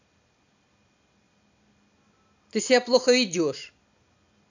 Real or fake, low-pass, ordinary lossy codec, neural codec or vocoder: real; 7.2 kHz; none; none